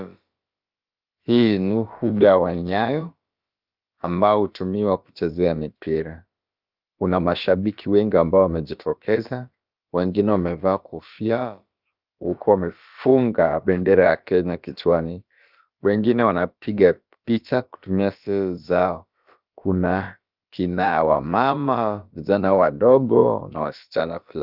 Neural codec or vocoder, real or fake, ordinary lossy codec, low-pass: codec, 16 kHz, about 1 kbps, DyCAST, with the encoder's durations; fake; Opus, 24 kbps; 5.4 kHz